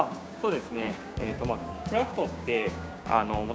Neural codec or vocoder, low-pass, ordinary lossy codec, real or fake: codec, 16 kHz, 6 kbps, DAC; none; none; fake